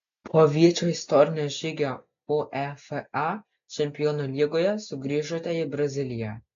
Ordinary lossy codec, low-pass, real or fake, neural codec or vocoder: AAC, 64 kbps; 7.2 kHz; real; none